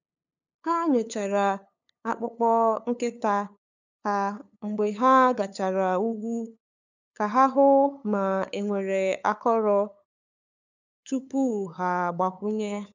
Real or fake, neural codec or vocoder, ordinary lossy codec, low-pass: fake; codec, 16 kHz, 8 kbps, FunCodec, trained on LibriTTS, 25 frames a second; none; 7.2 kHz